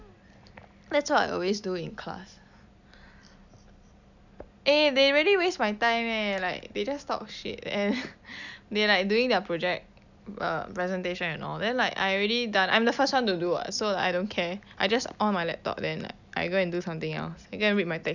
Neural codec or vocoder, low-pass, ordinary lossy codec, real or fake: none; 7.2 kHz; none; real